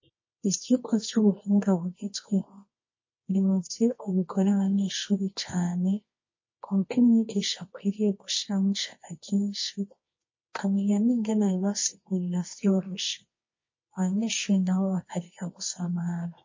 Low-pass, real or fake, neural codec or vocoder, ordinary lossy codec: 7.2 kHz; fake; codec, 24 kHz, 0.9 kbps, WavTokenizer, medium music audio release; MP3, 32 kbps